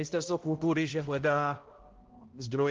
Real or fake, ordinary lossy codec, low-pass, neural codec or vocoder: fake; Opus, 16 kbps; 7.2 kHz; codec, 16 kHz, 0.5 kbps, X-Codec, HuBERT features, trained on balanced general audio